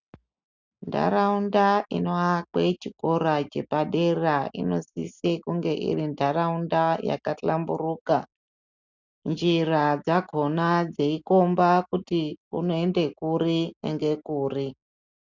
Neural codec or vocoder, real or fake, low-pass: none; real; 7.2 kHz